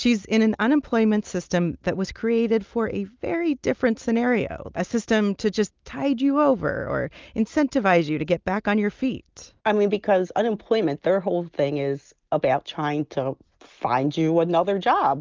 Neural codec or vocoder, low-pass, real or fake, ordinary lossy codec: none; 7.2 kHz; real; Opus, 32 kbps